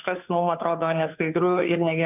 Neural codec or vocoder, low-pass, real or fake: codec, 16 kHz, 2 kbps, FunCodec, trained on Chinese and English, 25 frames a second; 3.6 kHz; fake